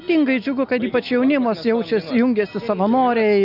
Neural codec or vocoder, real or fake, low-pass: none; real; 5.4 kHz